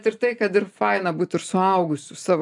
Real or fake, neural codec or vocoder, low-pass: real; none; 10.8 kHz